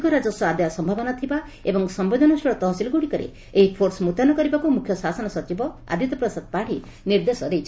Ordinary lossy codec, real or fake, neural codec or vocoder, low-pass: none; real; none; none